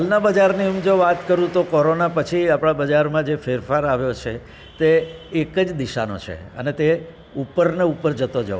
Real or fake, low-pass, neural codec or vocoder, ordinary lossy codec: real; none; none; none